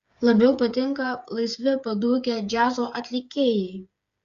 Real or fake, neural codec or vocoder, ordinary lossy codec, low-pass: fake; codec, 16 kHz, 8 kbps, FreqCodec, smaller model; Opus, 64 kbps; 7.2 kHz